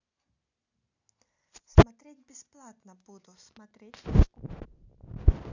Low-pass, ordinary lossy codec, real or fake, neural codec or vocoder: 7.2 kHz; none; real; none